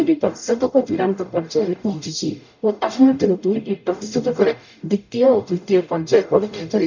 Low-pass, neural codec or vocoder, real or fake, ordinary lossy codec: 7.2 kHz; codec, 44.1 kHz, 0.9 kbps, DAC; fake; none